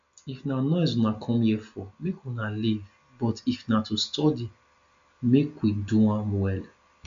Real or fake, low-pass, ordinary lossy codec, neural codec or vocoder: real; 7.2 kHz; AAC, 64 kbps; none